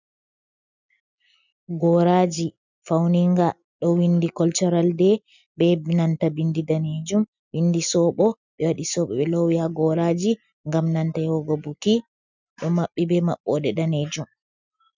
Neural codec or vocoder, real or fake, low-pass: none; real; 7.2 kHz